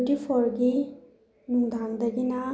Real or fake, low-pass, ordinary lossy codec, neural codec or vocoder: real; none; none; none